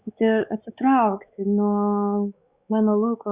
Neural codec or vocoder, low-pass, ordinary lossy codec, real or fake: codec, 16 kHz, 4 kbps, X-Codec, WavLM features, trained on Multilingual LibriSpeech; 3.6 kHz; Opus, 64 kbps; fake